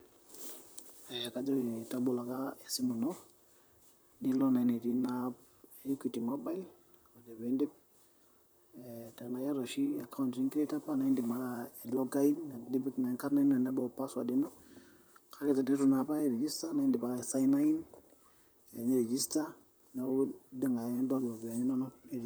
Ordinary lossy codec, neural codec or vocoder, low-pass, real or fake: none; vocoder, 44.1 kHz, 128 mel bands, Pupu-Vocoder; none; fake